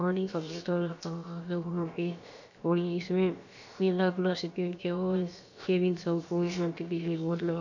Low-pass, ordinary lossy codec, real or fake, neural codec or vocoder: 7.2 kHz; none; fake; codec, 16 kHz, about 1 kbps, DyCAST, with the encoder's durations